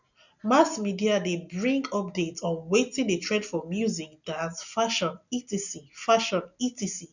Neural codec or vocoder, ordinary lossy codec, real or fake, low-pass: none; none; real; 7.2 kHz